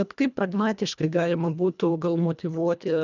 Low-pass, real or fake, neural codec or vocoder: 7.2 kHz; fake; codec, 24 kHz, 1.5 kbps, HILCodec